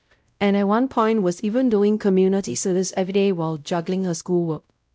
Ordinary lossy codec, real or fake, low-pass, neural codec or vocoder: none; fake; none; codec, 16 kHz, 0.5 kbps, X-Codec, WavLM features, trained on Multilingual LibriSpeech